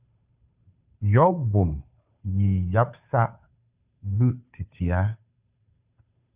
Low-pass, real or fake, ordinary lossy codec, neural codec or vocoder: 3.6 kHz; fake; Opus, 64 kbps; codec, 16 kHz, 2 kbps, FunCodec, trained on Chinese and English, 25 frames a second